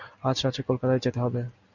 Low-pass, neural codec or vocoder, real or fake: 7.2 kHz; none; real